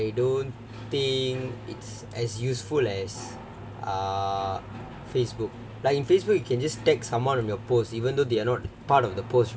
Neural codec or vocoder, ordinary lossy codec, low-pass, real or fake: none; none; none; real